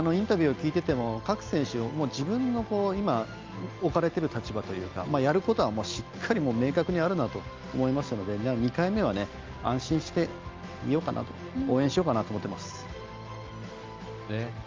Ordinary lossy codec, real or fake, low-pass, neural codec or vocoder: Opus, 24 kbps; real; 7.2 kHz; none